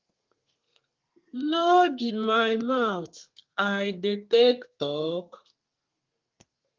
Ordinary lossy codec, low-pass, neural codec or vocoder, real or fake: Opus, 32 kbps; 7.2 kHz; codec, 32 kHz, 1.9 kbps, SNAC; fake